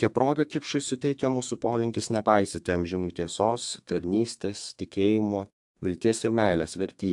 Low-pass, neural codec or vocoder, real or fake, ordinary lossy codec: 10.8 kHz; codec, 32 kHz, 1.9 kbps, SNAC; fake; AAC, 64 kbps